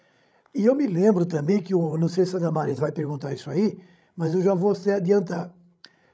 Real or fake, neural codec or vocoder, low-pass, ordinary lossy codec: fake; codec, 16 kHz, 16 kbps, FreqCodec, larger model; none; none